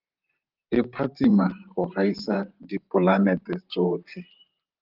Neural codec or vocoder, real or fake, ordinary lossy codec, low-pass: vocoder, 44.1 kHz, 128 mel bands, Pupu-Vocoder; fake; Opus, 32 kbps; 5.4 kHz